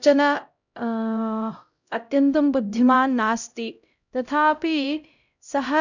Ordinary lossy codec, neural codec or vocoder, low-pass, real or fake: none; codec, 16 kHz, 0.5 kbps, X-Codec, HuBERT features, trained on LibriSpeech; 7.2 kHz; fake